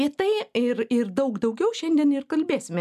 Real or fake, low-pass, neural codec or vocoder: real; 14.4 kHz; none